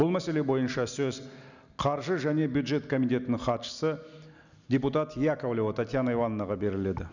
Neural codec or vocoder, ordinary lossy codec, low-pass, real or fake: none; none; 7.2 kHz; real